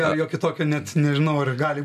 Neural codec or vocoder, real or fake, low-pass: none; real; 14.4 kHz